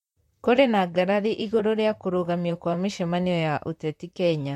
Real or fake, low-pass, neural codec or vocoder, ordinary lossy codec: fake; 19.8 kHz; vocoder, 44.1 kHz, 128 mel bands, Pupu-Vocoder; MP3, 64 kbps